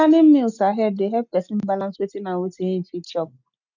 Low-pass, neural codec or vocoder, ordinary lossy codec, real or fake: 7.2 kHz; none; none; real